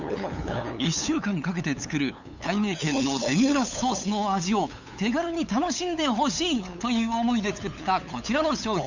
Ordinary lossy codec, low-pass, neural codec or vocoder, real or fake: none; 7.2 kHz; codec, 16 kHz, 8 kbps, FunCodec, trained on LibriTTS, 25 frames a second; fake